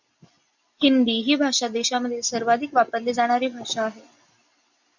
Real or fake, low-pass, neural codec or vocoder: real; 7.2 kHz; none